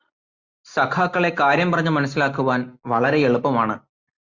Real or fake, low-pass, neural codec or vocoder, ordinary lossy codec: real; 7.2 kHz; none; Opus, 64 kbps